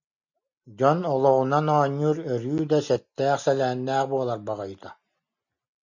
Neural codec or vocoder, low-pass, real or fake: none; 7.2 kHz; real